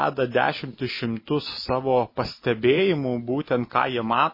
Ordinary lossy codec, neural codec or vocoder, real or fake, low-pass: MP3, 24 kbps; none; real; 5.4 kHz